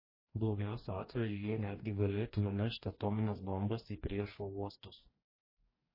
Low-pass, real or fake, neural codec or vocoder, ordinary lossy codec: 5.4 kHz; fake; codec, 44.1 kHz, 2.6 kbps, DAC; MP3, 24 kbps